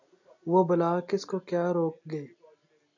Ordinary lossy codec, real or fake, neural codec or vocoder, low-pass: AAC, 48 kbps; real; none; 7.2 kHz